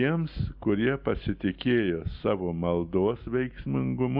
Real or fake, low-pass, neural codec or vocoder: real; 5.4 kHz; none